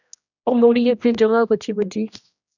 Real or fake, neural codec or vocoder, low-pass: fake; codec, 16 kHz, 1 kbps, X-Codec, HuBERT features, trained on general audio; 7.2 kHz